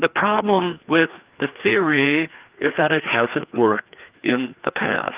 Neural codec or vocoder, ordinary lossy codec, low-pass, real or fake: codec, 16 kHz, 2 kbps, FreqCodec, larger model; Opus, 32 kbps; 3.6 kHz; fake